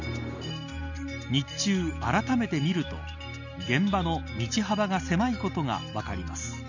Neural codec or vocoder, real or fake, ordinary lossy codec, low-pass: none; real; none; 7.2 kHz